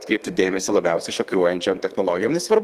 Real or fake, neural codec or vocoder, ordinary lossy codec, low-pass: fake; codec, 32 kHz, 1.9 kbps, SNAC; Opus, 24 kbps; 14.4 kHz